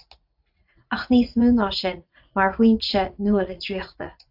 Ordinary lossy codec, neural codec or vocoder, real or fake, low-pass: AAC, 48 kbps; vocoder, 44.1 kHz, 80 mel bands, Vocos; fake; 5.4 kHz